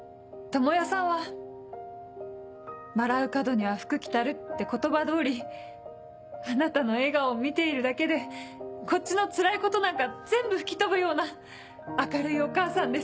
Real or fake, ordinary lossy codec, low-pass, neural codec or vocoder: real; none; none; none